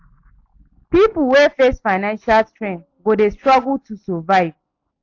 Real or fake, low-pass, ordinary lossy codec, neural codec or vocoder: real; 7.2 kHz; AAC, 48 kbps; none